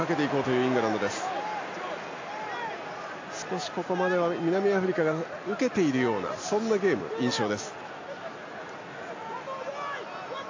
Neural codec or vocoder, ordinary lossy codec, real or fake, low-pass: none; AAC, 48 kbps; real; 7.2 kHz